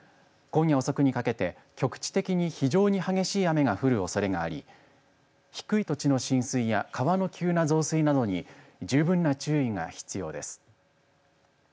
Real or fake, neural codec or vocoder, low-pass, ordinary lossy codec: real; none; none; none